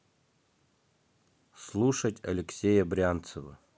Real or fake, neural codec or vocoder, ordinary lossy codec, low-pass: real; none; none; none